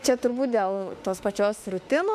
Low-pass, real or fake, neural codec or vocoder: 14.4 kHz; fake; autoencoder, 48 kHz, 32 numbers a frame, DAC-VAE, trained on Japanese speech